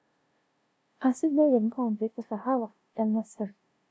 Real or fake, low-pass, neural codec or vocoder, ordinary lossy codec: fake; none; codec, 16 kHz, 0.5 kbps, FunCodec, trained on LibriTTS, 25 frames a second; none